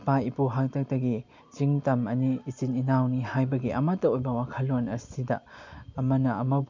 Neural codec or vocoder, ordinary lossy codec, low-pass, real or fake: none; MP3, 64 kbps; 7.2 kHz; real